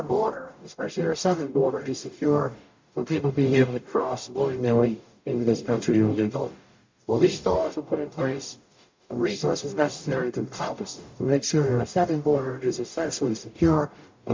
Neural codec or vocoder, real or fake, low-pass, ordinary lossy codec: codec, 44.1 kHz, 0.9 kbps, DAC; fake; 7.2 kHz; MP3, 48 kbps